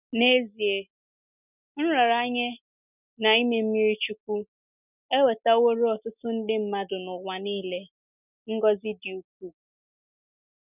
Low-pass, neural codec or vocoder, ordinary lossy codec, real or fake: 3.6 kHz; none; none; real